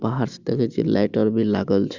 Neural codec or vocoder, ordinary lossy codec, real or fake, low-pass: none; Opus, 64 kbps; real; 7.2 kHz